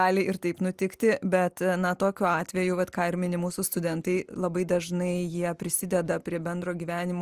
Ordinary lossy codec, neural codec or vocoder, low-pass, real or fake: Opus, 32 kbps; none; 14.4 kHz; real